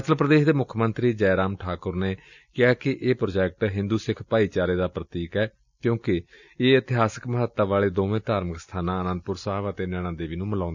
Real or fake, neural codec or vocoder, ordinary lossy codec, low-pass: real; none; none; 7.2 kHz